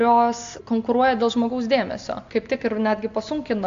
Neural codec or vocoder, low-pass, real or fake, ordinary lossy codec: none; 7.2 kHz; real; AAC, 64 kbps